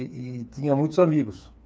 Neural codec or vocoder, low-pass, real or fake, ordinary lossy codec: codec, 16 kHz, 4 kbps, FreqCodec, smaller model; none; fake; none